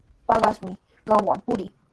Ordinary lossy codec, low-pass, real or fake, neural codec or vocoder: Opus, 16 kbps; 10.8 kHz; fake; vocoder, 24 kHz, 100 mel bands, Vocos